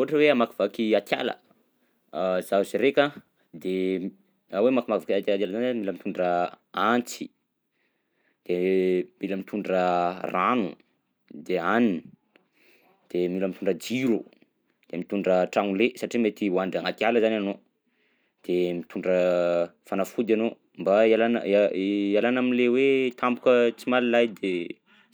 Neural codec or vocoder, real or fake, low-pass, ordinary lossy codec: none; real; none; none